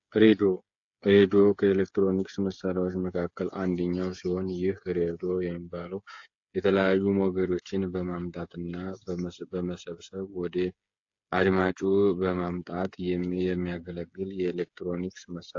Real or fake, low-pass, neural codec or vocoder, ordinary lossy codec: fake; 7.2 kHz; codec, 16 kHz, 8 kbps, FreqCodec, smaller model; AAC, 48 kbps